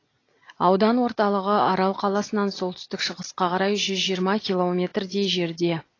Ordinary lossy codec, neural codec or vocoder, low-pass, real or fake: AAC, 32 kbps; none; 7.2 kHz; real